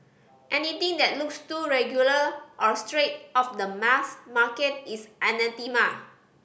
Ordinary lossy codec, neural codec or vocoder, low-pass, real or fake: none; none; none; real